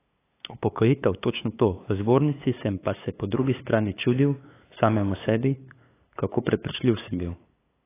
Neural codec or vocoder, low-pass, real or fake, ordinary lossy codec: codec, 16 kHz, 8 kbps, FunCodec, trained on LibriTTS, 25 frames a second; 3.6 kHz; fake; AAC, 16 kbps